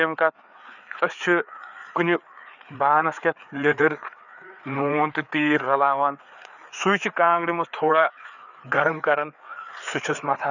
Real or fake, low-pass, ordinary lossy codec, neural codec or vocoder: fake; 7.2 kHz; none; codec, 16 kHz, 4 kbps, FreqCodec, larger model